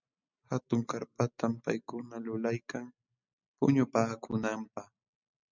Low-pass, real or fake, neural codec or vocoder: 7.2 kHz; real; none